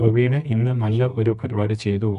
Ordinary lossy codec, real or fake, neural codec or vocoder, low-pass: none; fake; codec, 24 kHz, 0.9 kbps, WavTokenizer, medium music audio release; 10.8 kHz